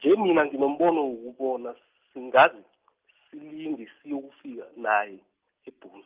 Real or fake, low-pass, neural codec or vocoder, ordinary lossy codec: real; 3.6 kHz; none; Opus, 32 kbps